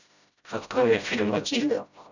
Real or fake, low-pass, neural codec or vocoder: fake; 7.2 kHz; codec, 16 kHz, 0.5 kbps, FreqCodec, smaller model